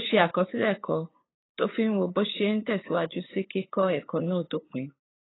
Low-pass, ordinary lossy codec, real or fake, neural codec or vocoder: 7.2 kHz; AAC, 16 kbps; fake; codec, 16 kHz, 8 kbps, FunCodec, trained on LibriTTS, 25 frames a second